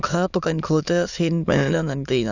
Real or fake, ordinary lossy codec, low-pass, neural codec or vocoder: fake; none; 7.2 kHz; autoencoder, 22.05 kHz, a latent of 192 numbers a frame, VITS, trained on many speakers